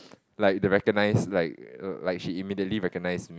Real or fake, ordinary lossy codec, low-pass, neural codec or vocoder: real; none; none; none